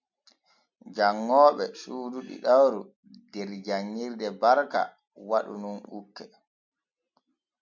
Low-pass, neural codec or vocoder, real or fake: 7.2 kHz; none; real